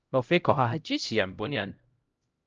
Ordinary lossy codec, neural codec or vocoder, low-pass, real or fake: Opus, 24 kbps; codec, 16 kHz, 0.5 kbps, X-Codec, HuBERT features, trained on LibriSpeech; 7.2 kHz; fake